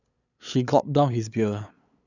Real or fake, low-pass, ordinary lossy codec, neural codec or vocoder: fake; 7.2 kHz; none; codec, 16 kHz, 8 kbps, FunCodec, trained on LibriTTS, 25 frames a second